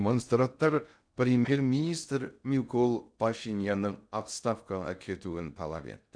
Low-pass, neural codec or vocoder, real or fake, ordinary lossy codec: 9.9 kHz; codec, 16 kHz in and 24 kHz out, 0.6 kbps, FocalCodec, streaming, 2048 codes; fake; MP3, 96 kbps